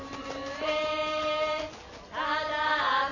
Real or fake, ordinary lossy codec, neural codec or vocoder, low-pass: real; none; none; 7.2 kHz